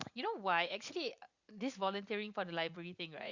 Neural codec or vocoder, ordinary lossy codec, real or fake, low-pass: vocoder, 44.1 kHz, 80 mel bands, Vocos; Opus, 64 kbps; fake; 7.2 kHz